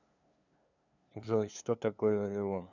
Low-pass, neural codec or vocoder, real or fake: 7.2 kHz; codec, 16 kHz, 2 kbps, FunCodec, trained on LibriTTS, 25 frames a second; fake